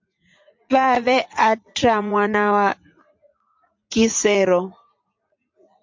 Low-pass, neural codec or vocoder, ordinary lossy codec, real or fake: 7.2 kHz; vocoder, 44.1 kHz, 80 mel bands, Vocos; MP3, 48 kbps; fake